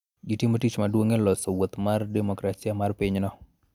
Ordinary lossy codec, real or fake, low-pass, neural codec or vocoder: none; real; 19.8 kHz; none